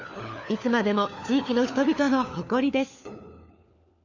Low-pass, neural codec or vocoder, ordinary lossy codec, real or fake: 7.2 kHz; codec, 16 kHz, 4 kbps, FunCodec, trained on LibriTTS, 50 frames a second; none; fake